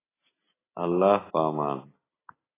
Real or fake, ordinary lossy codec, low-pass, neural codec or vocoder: real; AAC, 16 kbps; 3.6 kHz; none